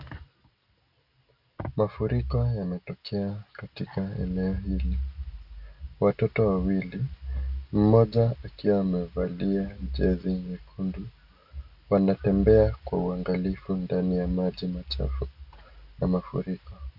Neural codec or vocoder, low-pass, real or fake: none; 5.4 kHz; real